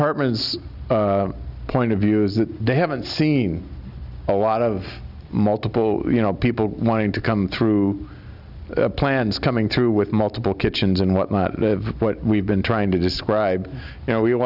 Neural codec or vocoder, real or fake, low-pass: none; real; 5.4 kHz